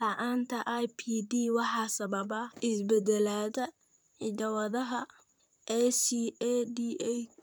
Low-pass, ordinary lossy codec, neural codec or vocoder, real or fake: none; none; vocoder, 44.1 kHz, 128 mel bands, Pupu-Vocoder; fake